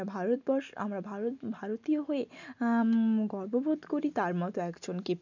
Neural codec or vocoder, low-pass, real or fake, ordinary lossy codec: none; 7.2 kHz; real; none